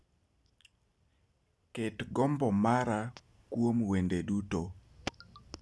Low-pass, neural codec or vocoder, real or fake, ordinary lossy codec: none; none; real; none